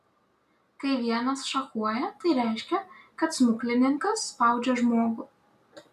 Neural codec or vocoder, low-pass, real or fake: none; 14.4 kHz; real